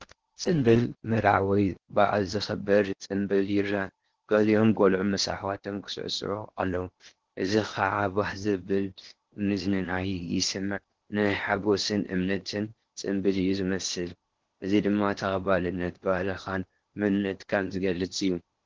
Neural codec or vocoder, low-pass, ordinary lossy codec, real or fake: codec, 16 kHz in and 24 kHz out, 0.8 kbps, FocalCodec, streaming, 65536 codes; 7.2 kHz; Opus, 16 kbps; fake